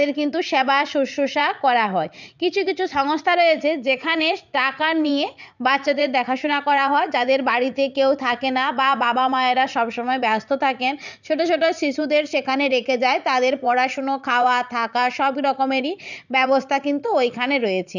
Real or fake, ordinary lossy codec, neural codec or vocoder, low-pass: fake; none; vocoder, 44.1 kHz, 80 mel bands, Vocos; 7.2 kHz